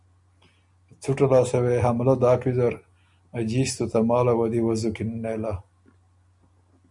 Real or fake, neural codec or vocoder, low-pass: real; none; 10.8 kHz